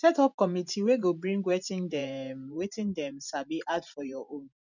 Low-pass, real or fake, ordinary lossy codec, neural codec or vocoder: 7.2 kHz; fake; none; vocoder, 44.1 kHz, 128 mel bands every 512 samples, BigVGAN v2